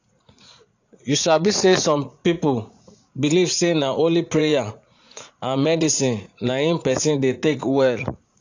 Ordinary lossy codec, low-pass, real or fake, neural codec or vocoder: AAC, 48 kbps; 7.2 kHz; fake; vocoder, 44.1 kHz, 80 mel bands, Vocos